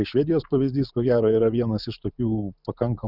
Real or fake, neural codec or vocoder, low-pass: fake; vocoder, 44.1 kHz, 128 mel bands every 512 samples, BigVGAN v2; 5.4 kHz